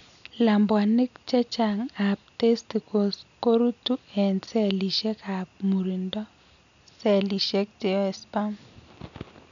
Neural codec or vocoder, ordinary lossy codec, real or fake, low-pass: none; none; real; 7.2 kHz